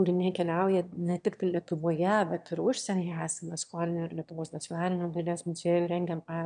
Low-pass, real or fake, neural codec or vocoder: 9.9 kHz; fake; autoencoder, 22.05 kHz, a latent of 192 numbers a frame, VITS, trained on one speaker